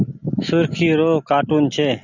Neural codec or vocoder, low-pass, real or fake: none; 7.2 kHz; real